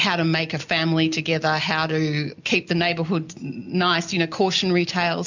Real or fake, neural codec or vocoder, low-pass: real; none; 7.2 kHz